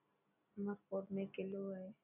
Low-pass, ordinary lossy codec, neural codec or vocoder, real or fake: 3.6 kHz; AAC, 32 kbps; none; real